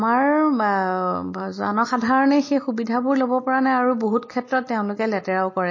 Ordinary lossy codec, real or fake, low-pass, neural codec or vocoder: MP3, 32 kbps; real; 7.2 kHz; none